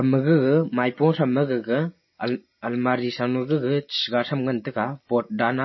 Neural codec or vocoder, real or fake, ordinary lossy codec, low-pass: none; real; MP3, 24 kbps; 7.2 kHz